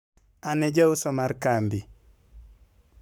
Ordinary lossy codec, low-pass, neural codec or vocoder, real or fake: none; none; codec, 44.1 kHz, 7.8 kbps, Pupu-Codec; fake